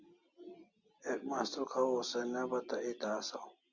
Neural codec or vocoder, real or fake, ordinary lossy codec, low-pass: none; real; Opus, 64 kbps; 7.2 kHz